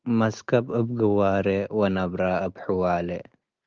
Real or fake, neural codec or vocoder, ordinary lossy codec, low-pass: real; none; Opus, 24 kbps; 7.2 kHz